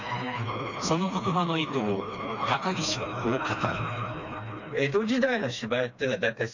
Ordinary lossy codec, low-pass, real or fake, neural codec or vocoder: none; 7.2 kHz; fake; codec, 16 kHz, 2 kbps, FreqCodec, smaller model